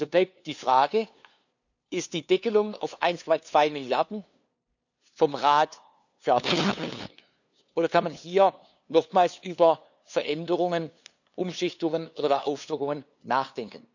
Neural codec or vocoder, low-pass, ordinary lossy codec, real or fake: codec, 16 kHz, 2 kbps, FunCodec, trained on LibriTTS, 25 frames a second; 7.2 kHz; none; fake